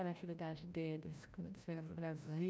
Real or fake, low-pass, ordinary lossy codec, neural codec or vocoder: fake; none; none; codec, 16 kHz, 0.5 kbps, FreqCodec, larger model